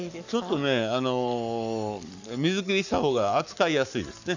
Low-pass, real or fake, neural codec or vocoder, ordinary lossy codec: 7.2 kHz; fake; codec, 44.1 kHz, 7.8 kbps, Pupu-Codec; none